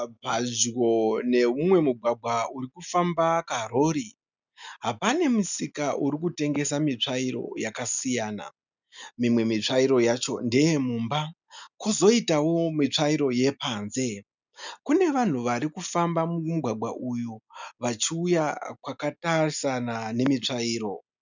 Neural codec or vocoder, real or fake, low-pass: none; real; 7.2 kHz